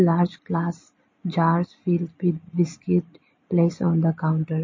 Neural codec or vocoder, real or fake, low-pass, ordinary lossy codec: none; real; 7.2 kHz; MP3, 32 kbps